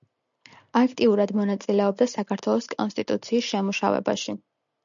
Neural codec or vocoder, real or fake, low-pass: none; real; 7.2 kHz